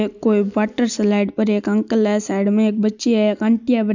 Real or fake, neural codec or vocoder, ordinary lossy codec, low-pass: real; none; none; 7.2 kHz